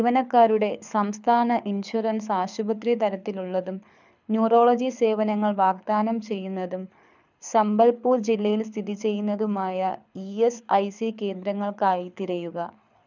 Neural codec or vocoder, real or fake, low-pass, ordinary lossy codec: codec, 24 kHz, 6 kbps, HILCodec; fake; 7.2 kHz; none